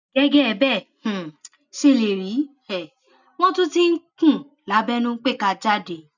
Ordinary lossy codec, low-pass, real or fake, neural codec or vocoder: none; 7.2 kHz; real; none